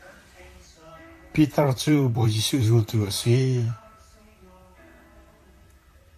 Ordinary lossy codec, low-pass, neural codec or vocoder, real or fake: AAC, 64 kbps; 14.4 kHz; vocoder, 44.1 kHz, 128 mel bands, Pupu-Vocoder; fake